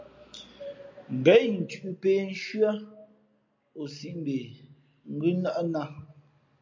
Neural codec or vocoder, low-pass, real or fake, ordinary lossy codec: none; 7.2 kHz; real; MP3, 64 kbps